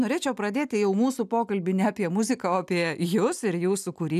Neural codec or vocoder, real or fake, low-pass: none; real; 14.4 kHz